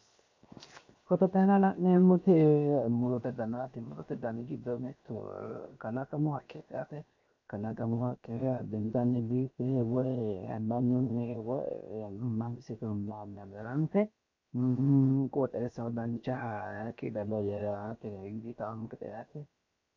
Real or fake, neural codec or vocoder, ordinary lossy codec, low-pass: fake; codec, 16 kHz, 0.7 kbps, FocalCodec; MP3, 48 kbps; 7.2 kHz